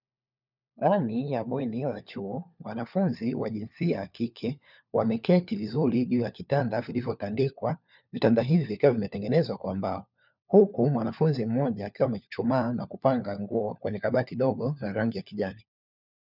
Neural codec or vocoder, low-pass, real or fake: codec, 16 kHz, 4 kbps, FunCodec, trained on LibriTTS, 50 frames a second; 5.4 kHz; fake